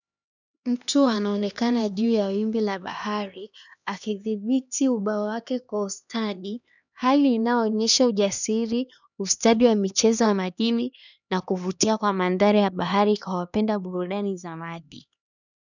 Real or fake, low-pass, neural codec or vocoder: fake; 7.2 kHz; codec, 16 kHz, 2 kbps, X-Codec, HuBERT features, trained on LibriSpeech